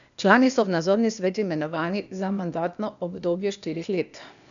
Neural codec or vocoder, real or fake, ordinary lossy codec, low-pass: codec, 16 kHz, 0.8 kbps, ZipCodec; fake; none; 7.2 kHz